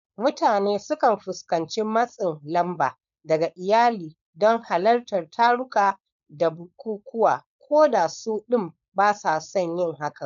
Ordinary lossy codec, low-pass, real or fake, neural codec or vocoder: MP3, 96 kbps; 7.2 kHz; fake; codec, 16 kHz, 4.8 kbps, FACodec